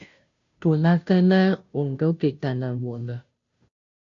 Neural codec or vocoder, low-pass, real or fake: codec, 16 kHz, 0.5 kbps, FunCodec, trained on Chinese and English, 25 frames a second; 7.2 kHz; fake